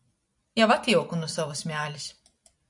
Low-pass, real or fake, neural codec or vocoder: 10.8 kHz; real; none